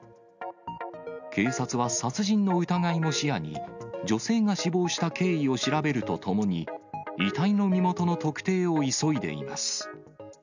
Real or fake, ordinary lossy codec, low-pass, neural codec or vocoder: real; none; 7.2 kHz; none